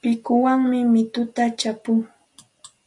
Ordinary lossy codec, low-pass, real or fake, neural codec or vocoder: MP3, 96 kbps; 10.8 kHz; real; none